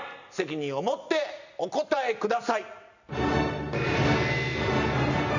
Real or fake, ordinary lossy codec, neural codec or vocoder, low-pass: real; none; none; 7.2 kHz